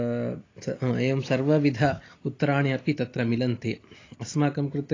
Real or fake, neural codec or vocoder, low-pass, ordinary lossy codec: real; none; 7.2 kHz; AAC, 32 kbps